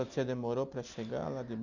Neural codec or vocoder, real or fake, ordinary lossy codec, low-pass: none; real; none; 7.2 kHz